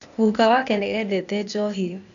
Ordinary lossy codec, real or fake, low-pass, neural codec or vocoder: none; fake; 7.2 kHz; codec, 16 kHz, 0.8 kbps, ZipCodec